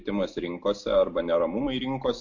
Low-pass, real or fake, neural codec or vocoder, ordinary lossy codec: 7.2 kHz; real; none; MP3, 48 kbps